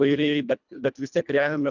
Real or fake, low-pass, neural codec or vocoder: fake; 7.2 kHz; codec, 24 kHz, 1.5 kbps, HILCodec